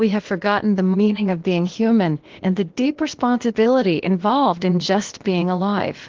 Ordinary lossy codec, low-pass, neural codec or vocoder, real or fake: Opus, 16 kbps; 7.2 kHz; codec, 16 kHz, 0.8 kbps, ZipCodec; fake